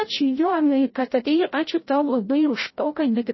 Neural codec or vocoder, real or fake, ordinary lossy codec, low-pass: codec, 16 kHz, 0.5 kbps, FreqCodec, larger model; fake; MP3, 24 kbps; 7.2 kHz